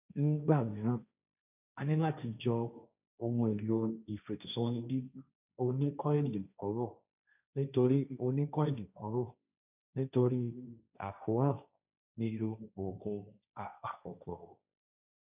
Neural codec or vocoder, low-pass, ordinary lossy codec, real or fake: codec, 16 kHz, 1.1 kbps, Voila-Tokenizer; 3.6 kHz; none; fake